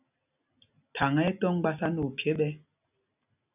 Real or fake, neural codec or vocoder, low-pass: real; none; 3.6 kHz